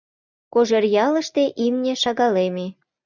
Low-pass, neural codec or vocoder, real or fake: 7.2 kHz; none; real